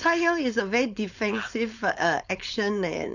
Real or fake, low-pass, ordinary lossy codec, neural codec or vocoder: fake; 7.2 kHz; none; codec, 16 kHz, 4.8 kbps, FACodec